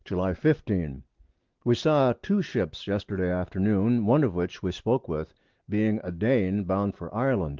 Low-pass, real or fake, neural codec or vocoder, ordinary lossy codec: 7.2 kHz; real; none; Opus, 16 kbps